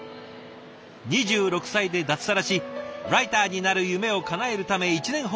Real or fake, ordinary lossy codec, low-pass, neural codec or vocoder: real; none; none; none